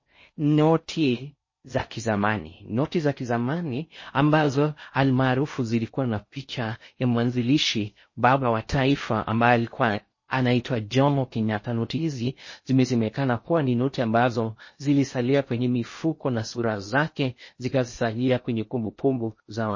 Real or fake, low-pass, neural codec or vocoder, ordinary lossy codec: fake; 7.2 kHz; codec, 16 kHz in and 24 kHz out, 0.6 kbps, FocalCodec, streaming, 4096 codes; MP3, 32 kbps